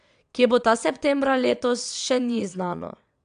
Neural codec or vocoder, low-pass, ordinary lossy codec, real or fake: vocoder, 22.05 kHz, 80 mel bands, WaveNeXt; 9.9 kHz; AAC, 96 kbps; fake